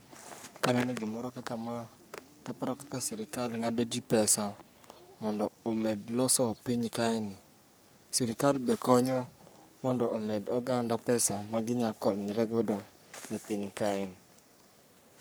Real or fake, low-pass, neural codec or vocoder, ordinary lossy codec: fake; none; codec, 44.1 kHz, 3.4 kbps, Pupu-Codec; none